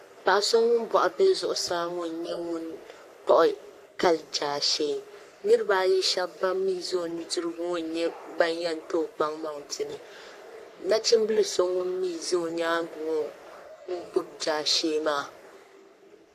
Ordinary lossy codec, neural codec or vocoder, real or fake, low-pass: AAC, 64 kbps; codec, 44.1 kHz, 3.4 kbps, Pupu-Codec; fake; 14.4 kHz